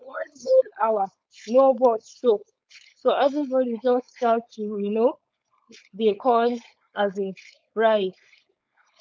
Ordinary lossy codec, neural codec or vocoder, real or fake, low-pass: none; codec, 16 kHz, 4.8 kbps, FACodec; fake; none